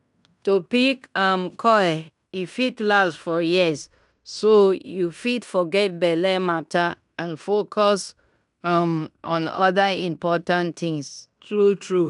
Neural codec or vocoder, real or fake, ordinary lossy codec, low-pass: codec, 16 kHz in and 24 kHz out, 0.9 kbps, LongCat-Audio-Codec, fine tuned four codebook decoder; fake; none; 10.8 kHz